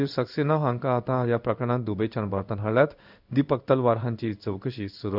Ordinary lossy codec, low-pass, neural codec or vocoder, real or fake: none; 5.4 kHz; codec, 16 kHz in and 24 kHz out, 1 kbps, XY-Tokenizer; fake